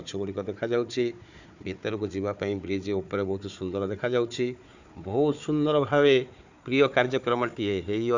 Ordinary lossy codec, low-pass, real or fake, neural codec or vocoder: none; 7.2 kHz; fake; codec, 16 kHz, 4 kbps, FunCodec, trained on Chinese and English, 50 frames a second